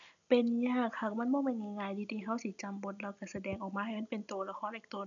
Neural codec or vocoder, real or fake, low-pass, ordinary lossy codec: none; real; 7.2 kHz; none